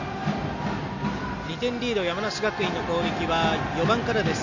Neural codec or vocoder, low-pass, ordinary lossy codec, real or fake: none; 7.2 kHz; none; real